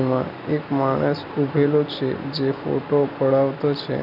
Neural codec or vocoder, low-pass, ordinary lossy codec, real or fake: none; 5.4 kHz; none; real